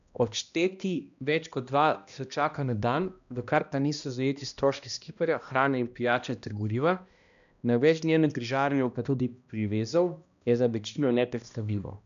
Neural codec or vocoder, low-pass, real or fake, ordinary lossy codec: codec, 16 kHz, 1 kbps, X-Codec, HuBERT features, trained on balanced general audio; 7.2 kHz; fake; none